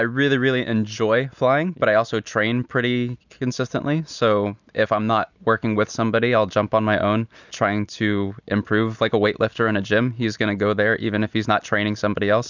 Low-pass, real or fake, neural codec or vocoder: 7.2 kHz; real; none